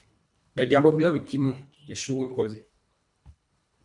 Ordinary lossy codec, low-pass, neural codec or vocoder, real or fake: MP3, 96 kbps; 10.8 kHz; codec, 24 kHz, 1.5 kbps, HILCodec; fake